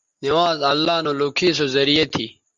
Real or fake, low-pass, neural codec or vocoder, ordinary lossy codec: real; 7.2 kHz; none; Opus, 32 kbps